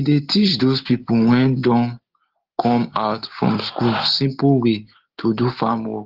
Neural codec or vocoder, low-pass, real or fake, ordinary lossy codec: none; 5.4 kHz; real; Opus, 16 kbps